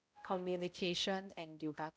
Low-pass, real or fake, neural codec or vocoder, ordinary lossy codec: none; fake; codec, 16 kHz, 0.5 kbps, X-Codec, HuBERT features, trained on balanced general audio; none